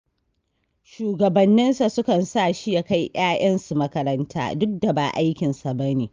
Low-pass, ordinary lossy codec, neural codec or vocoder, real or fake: 7.2 kHz; Opus, 24 kbps; none; real